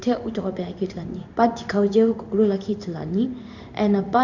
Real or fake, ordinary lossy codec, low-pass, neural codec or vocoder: fake; Opus, 64 kbps; 7.2 kHz; codec, 16 kHz in and 24 kHz out, 1 kbps, XY-Tokenizer